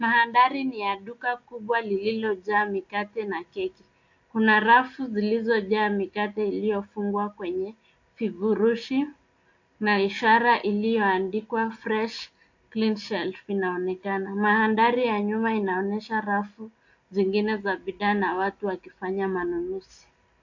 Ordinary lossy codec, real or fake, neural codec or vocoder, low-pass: AAC, 48 kbps; real; none; 7.2 kHz